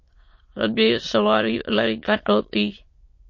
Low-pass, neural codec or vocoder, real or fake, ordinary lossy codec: 7.2 kHz; autoencoder, 22.05 kHz, a latent of 192 numbers a frame, VITS, trained on many speakers; fake; MP3, 32 kbps